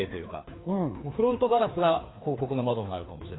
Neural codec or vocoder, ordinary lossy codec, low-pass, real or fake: codec, 16 kHz, 2 kbps, FreqCodec, larger model; AAC, 16 kbps; 7.2 kHz; fake